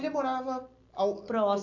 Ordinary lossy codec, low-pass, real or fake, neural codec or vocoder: none; 7.2 kHz; real; none